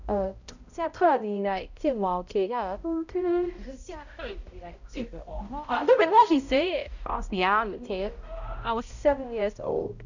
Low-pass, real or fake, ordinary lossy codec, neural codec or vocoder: 7.2 kHz; fake; none; codec, 16 kHz, 0.5 kbps, X-Codec, HuBERT features, trained on balanced general audio